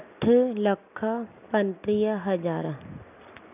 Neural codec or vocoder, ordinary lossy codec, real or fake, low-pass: none; none; real; 3.6 kHz